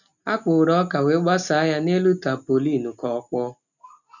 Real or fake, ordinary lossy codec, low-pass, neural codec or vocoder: real; none; 7.2 kHz; none